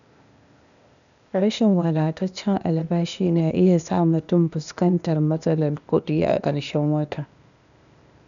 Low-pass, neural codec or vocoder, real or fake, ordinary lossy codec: 7.2 kHz; codec, 16 kHz, 0.8 kbps, ZipCodec; fake; none